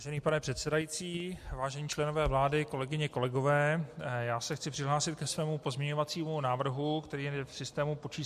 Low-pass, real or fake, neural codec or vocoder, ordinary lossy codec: 14.4 kHz; real; none; MP3, 64 kbps